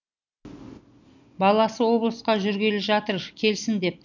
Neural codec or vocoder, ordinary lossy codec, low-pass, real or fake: none; none; 7.2 kHz; real